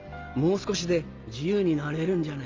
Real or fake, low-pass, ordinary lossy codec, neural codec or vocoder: real; 7.2 kHz; Opus, 32 kbps; none